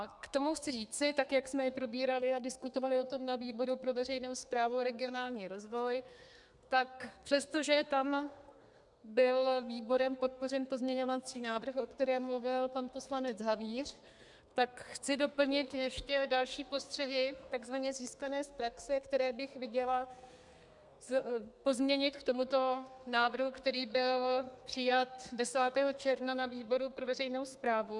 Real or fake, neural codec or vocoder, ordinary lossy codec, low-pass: fake; codec, 32 kHz, 1.9 kbps, SNAC; MP3, 96 kbps; 10.8 kHz